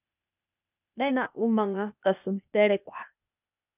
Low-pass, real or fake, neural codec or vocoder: 3.6 kHz; fake; codec, 16 kHz, 0.8 kbps, ZipCodec